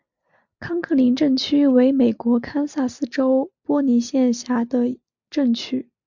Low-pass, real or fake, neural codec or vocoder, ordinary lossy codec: 7.2 kHz; real; none; MP3, 64 kbps